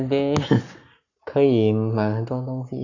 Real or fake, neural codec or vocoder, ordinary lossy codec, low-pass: fake; codec, 44.1 kHz, 7.8 kbps, Pupu-Codec; none; 7.2 kHz